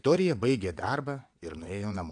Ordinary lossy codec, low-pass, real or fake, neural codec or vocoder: AAC, 64 kbps; 9.9 kHz; fake; vocoder, 22.05 kHz, 80 mel bands, WaveNeXt